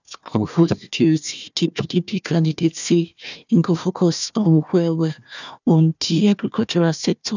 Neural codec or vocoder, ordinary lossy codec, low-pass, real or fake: codec, 16 kHz, 1 kbps, FunCodec, trained on Chinese and English, 50 frames a second; none; 7.2 kHz; fake